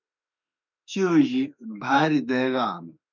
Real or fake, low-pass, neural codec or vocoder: fake; 7.2 kHz; autoencoder, 48 kHz, 32 numbers a frame, DAC-VAE, trained on Japanese speech